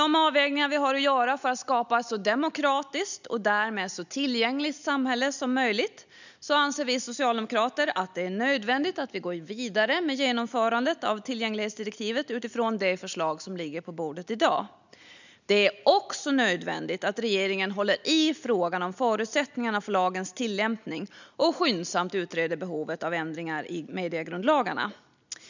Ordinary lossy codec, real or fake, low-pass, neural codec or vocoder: none; real; 7.2 kHz; none